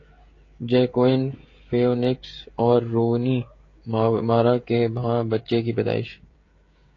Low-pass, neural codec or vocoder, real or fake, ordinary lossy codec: 7.2 kHz; codec, 16 kHz, 16 kbps, FreqCodec, smaller model; fake; AAC, 32 kbps